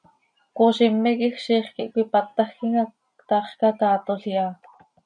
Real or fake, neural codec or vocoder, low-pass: real; none; 9.9 kHz